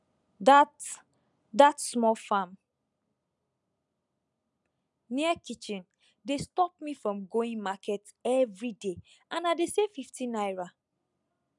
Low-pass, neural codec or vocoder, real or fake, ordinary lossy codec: 10.8 kHz; none; real; none